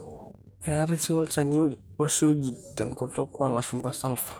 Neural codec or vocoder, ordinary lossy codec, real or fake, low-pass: codec, 44.1 kHz, 2.6 kbps, DAC; none; fake; none